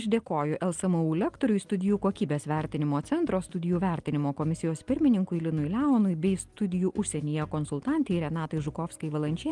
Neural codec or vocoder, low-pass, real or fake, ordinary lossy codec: none; 10.8 kHz; real; Opus, 24 kbps